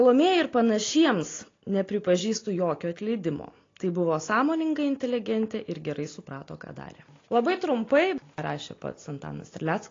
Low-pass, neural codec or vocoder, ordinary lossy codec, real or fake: 7.2 kHz; none; AAC, 32 kbps; real